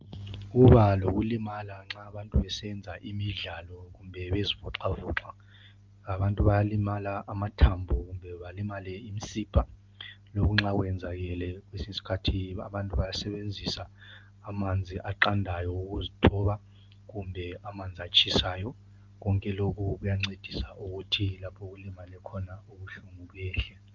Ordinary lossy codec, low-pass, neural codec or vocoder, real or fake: Opus, 24 kbps; 7.2 kHz; none; real